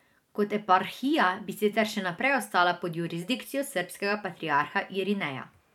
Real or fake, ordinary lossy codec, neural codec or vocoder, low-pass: fake; none; vocoder, 44.1 kHz, 128 mel bands every 512 samples, BigVGAN v2; 19.8 kHz